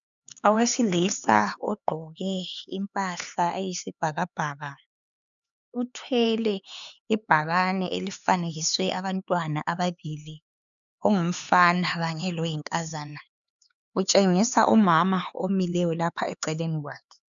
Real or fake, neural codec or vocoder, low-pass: fake; codec, 16 kHz, 4 kbps, X-Codec, HuBERT features, trained on LibriSpeech; 7.2 kHz